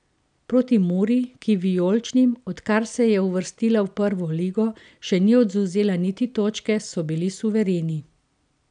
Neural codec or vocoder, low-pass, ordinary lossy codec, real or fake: none; 9.9 kHz; none; real